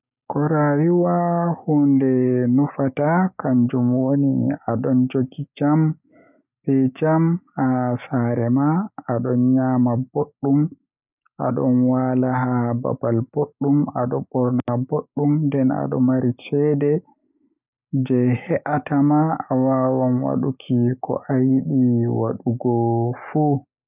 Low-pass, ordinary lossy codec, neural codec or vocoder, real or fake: 3.6 kHz; none; none; real